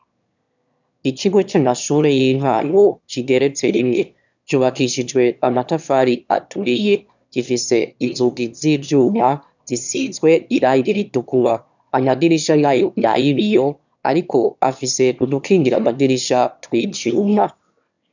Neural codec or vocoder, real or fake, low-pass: autoencoder, 22.05 kHz, a latent of 192 numbers a frame, VITS, trained on one speaker; fake; 7.2 kHz